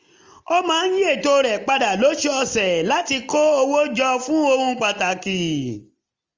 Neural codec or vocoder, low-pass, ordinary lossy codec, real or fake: none; 7.2 kHz; Opus, 32 kbps; real